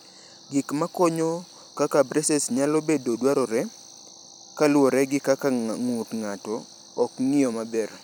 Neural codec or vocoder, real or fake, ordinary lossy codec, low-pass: vocoder, 44.1 kHz, 128 mel bands every 256 samples, BigVGAN v2; fake; none; none